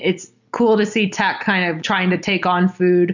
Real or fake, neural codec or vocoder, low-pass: real; none; 7.2 kHz